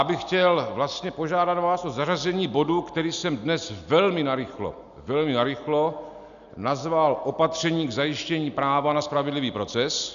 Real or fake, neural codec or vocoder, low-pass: real; none; 7.2 kHz